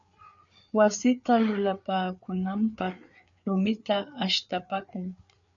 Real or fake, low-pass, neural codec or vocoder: fake; 7.2 kHz; codec, 16 kHz, 4 kbps, FreqCodec, larger model